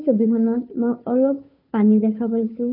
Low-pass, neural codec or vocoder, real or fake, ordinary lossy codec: 5.4 kHz; codec, 16 kHz, 2 kbps, FunCodec, trained on Chinese and English, 25 frames a second; fake; none